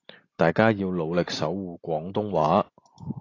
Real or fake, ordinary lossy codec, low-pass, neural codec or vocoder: real; AAC, 32 kbps; 7.2 kHz; none